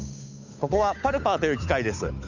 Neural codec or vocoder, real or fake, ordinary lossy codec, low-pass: codec, 16 kHz, 8 kbps, FunCodec, trained on Chinese and English, 25 frames a second; fake; none; 7.2 kHz